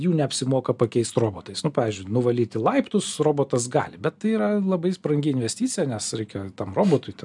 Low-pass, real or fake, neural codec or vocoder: 10.8 kHz; real; none